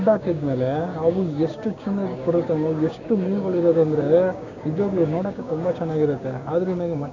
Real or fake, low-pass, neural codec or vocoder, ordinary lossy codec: fake; 7.2 kHz; codec, 16 kHz, 6 kbps, DAC; none